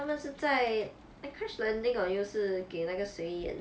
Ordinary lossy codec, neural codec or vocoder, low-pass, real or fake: none; none; none; real